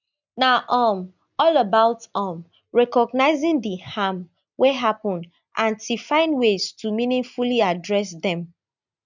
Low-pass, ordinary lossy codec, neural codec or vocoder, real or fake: 7.2 kHz; none; none; real